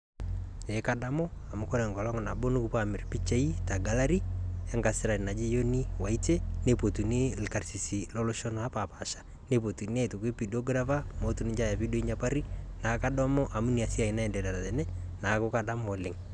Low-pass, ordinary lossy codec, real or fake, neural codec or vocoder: 9.9 kHz; none; real; none